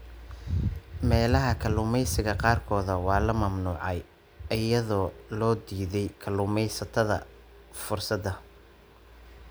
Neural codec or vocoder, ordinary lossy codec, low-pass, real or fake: none; none; none; real